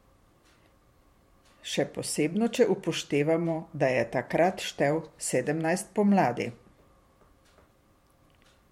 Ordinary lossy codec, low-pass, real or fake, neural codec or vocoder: MP3, 64 kbps; 19.8 kHz; fake; vocoder, 44.1 kHz, 128 mel bands every 512 samples, BigVGAN v2